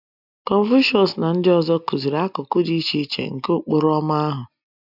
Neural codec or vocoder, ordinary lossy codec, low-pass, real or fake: none; none; 5.4 kHz; real